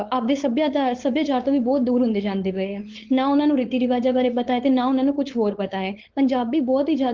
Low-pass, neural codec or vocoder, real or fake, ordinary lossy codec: 7.2 kHz; codec, 16 kHz, 4.8 kbps, FACodec; fake; Opus, 16 kbps